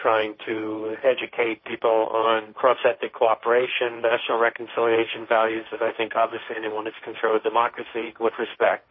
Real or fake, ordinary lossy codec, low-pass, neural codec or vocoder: fake; MP3, 24 kbps; 7.2 kHz; codec, 16 kHz, 1.1 kbps, Voila-Tokenizer